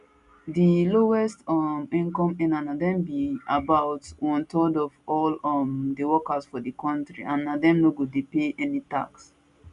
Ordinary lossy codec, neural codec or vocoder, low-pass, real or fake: none; none; 10.8 kHz; real